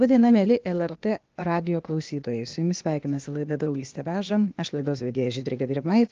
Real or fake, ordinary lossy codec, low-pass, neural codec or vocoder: fake; Opus, 32 kbps; 7.2 kHz; codec, 16 kHz, 0.8 kbps, ZipCodec